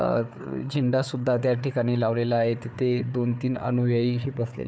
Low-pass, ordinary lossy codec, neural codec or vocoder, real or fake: none; none; codec, 16 kHz, 4 kbps, FunCodec, trained on Chinese and English, 50 frames a second; fake